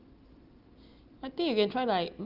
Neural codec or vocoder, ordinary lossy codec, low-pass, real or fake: none; Opus, 32 kbps; 5.4 kHz; real